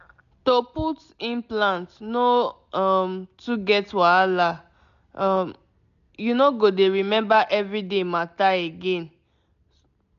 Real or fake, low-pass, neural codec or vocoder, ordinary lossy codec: real; 7.2 kHz; none; none